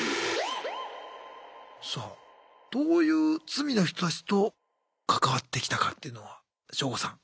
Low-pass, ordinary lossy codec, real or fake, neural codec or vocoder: none; none; real; none